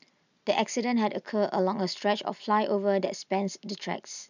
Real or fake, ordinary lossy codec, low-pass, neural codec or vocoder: real; none; 7.2 kHz; none